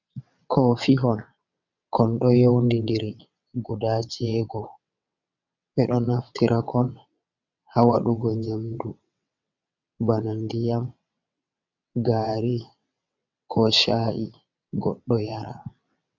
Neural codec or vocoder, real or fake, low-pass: vocoder, 22.05 kHz, 80 mel bands, WaveNeXt; fake; 7.2 kHz